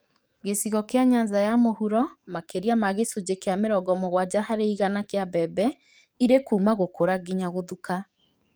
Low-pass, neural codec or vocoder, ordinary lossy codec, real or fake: none; codec, 44.1 kHz, 7.8 kbps, DAC; none; fake